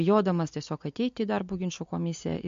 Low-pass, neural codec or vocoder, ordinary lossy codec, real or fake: 7.2 kHz; none; MP3, 48 kbps; real